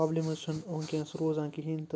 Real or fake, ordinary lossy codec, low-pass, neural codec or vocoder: real; none; none; none